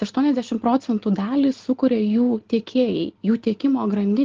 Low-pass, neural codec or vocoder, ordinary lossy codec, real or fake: 7.2 kHz; none; Opus, 16 kbps; real